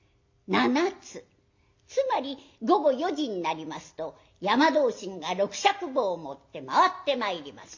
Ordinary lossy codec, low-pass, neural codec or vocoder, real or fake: MP3, 48 kbps; 7.2 kHz; none; real